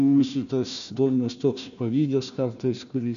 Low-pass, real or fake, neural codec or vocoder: 7.2 kHz; fake; codec, 16 kHz, 1 kbps, FunCodec, trained on Chinese and English, 50 frames a second